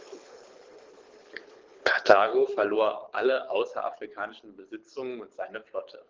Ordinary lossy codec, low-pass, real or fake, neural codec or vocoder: Opus, 16 kbps; 7.2 kHz; fake; codec, 24 kHz, 6 kbps, HILCodec